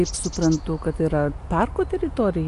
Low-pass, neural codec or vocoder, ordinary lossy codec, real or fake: 10.8 kHz; none; AAC, 64 kbps; real